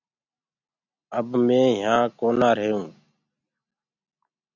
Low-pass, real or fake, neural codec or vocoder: 7.2 kHz; real; none